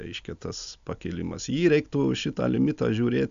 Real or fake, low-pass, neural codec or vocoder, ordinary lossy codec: real; 7.2 kHz; none; AAC, 96 kbps